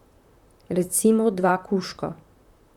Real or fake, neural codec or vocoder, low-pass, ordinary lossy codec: fake; vocoder, 44.1 kHz, 128 mel bands, Pupu-Vocoder; 19.8 kHz; none